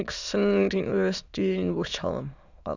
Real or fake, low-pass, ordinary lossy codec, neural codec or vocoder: fake; 7.2 kHz; none; autoencoder, 22.05 kHz, a latent of 192 numbers a frame, VITS, trained on many speakers